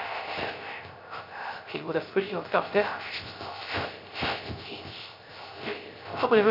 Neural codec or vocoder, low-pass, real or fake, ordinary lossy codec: codec, 16 kHz, 0.3 kbps, FocalCodec; 5.4 kHz; fake; none